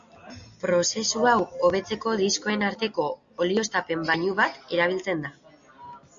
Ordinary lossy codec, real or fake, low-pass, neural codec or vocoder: Opus, 64 kbps; real; 7.2 kHz; none